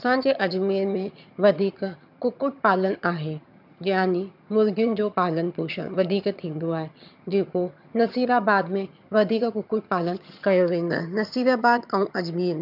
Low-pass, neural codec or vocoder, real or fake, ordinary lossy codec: 5.4 kHz; vocoder, 22.05 kHz, 80 mel bands, HiFi-GAN; fake; none